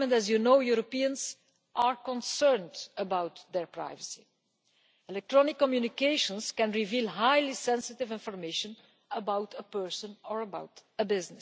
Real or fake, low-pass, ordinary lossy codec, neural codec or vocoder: real; none; none; none